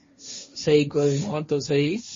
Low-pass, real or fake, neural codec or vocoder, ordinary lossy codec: 7.2 kHz; fake; codec, 16 kHz, 1.1 kbps, Voila-Tokenizer; MP3, 32 kbps